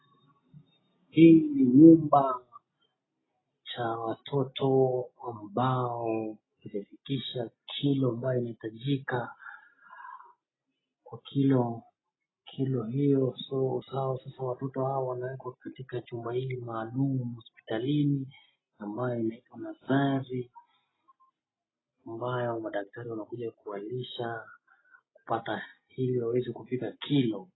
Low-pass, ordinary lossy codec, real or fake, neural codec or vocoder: 7.2 kHz; AAC, 16 kbps; real; none